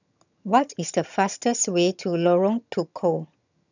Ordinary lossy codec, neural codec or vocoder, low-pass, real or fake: none; vocoder, 22.05 kHz, 80 mel bands, HiFi-GAN; 7.2 kHz; fake